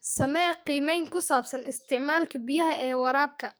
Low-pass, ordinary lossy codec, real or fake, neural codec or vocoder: none; none; fake; codec, 44.1 kHz, 2.6 kbps, SNAC